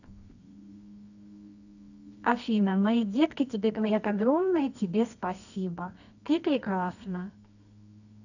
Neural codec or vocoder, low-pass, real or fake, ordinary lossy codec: codec, 24 kHz, 0.9 kbps, WavTokenizer, medium music audio release; 7.2 kHz; fake; none